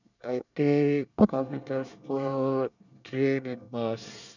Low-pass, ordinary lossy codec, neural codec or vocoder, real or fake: 7.2 kHz; none; codec, 24 kHz, 1 kbps, SNAC; fake